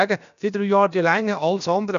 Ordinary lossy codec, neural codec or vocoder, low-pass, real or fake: none; codec, 16 kHz, about 1 kbps, DyCAST, with the encoder's durations; 7.2 kHz; fake